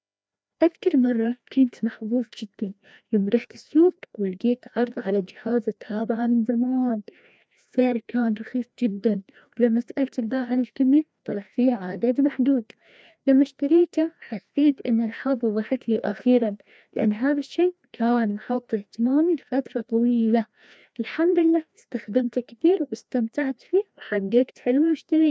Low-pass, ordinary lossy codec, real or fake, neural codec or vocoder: none; none; fake; codec, 16 kHz, 1 kbps, FreqCodec, larger model